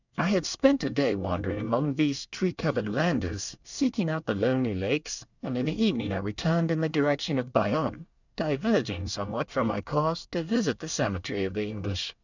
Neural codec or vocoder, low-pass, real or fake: codec, 24 kHz, 1 kbps, SNAC; 7.2 kHz; fake